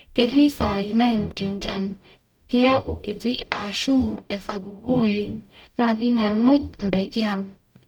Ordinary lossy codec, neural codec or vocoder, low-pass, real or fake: none; codec, 44.1 kHz, 0.9 kbps, DAC; 19.8 kHz; fake